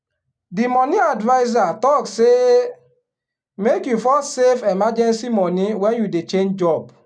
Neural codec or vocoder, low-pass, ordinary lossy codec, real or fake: none; 9.9 kHz; none; real